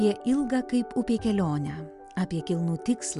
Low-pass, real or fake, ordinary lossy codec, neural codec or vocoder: 10.8 kHz; real; Opus, 64 kbps; none